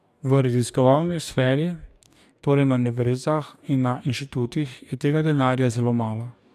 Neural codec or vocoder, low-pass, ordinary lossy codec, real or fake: codec, 44.1 kHz, 2.6 kbps, DAC; 14.4 kHz; none; fake